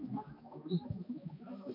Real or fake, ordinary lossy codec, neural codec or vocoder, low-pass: fake; MP3, 32 kbps; codec, 16 kHz, 1 kbps, X-Codec, HuBERT features, trained on balanced general audio; 5.4 kHz